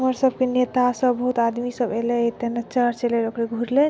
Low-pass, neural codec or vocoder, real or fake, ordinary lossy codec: none; none; real; none